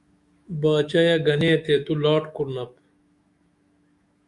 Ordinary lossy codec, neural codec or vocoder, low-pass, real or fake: Opus, 64 kbps; autoencoder, 48 kHz, 128 numbers a frame, DAC-VAE, trained on Japanese speech; 10.8 kHz; fake